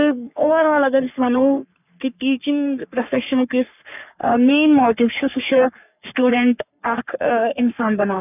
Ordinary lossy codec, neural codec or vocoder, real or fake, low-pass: none; codec, 44.1 kHz, 3.4 kbps, Pupu-Codec; fake; 3.6 kHz